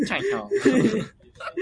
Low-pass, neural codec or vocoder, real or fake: 9.9 kHz; none; real